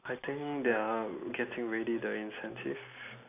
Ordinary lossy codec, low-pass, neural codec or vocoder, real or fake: AAC, 24 kbps; 3.6 kHz; none; real